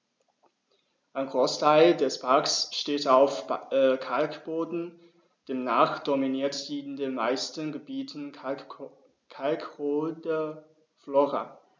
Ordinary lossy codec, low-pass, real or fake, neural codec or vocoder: none; none; real; none